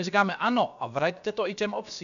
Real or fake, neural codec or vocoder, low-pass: fake; codec, 16 kHz, about 1 kbps, DyCAST, with the encoder's durations; 7.2 kHz